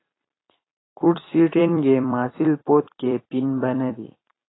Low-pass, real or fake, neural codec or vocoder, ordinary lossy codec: 7.2 kHz; fake; vocoder, 44.1 kHz, 128 mel bands every 256 samples, BigVGAN v2; AAC, 16 kbps